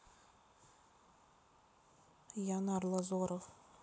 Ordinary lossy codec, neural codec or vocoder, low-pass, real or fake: none; none; none; real